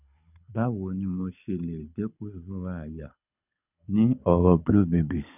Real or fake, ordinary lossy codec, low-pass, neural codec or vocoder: fake; none; 3.6 kHz; codec, 24 kHz, 6 kbps, HILCodec